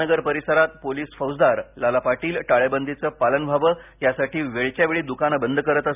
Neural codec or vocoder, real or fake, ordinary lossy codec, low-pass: none; real; none; 3.6 kHz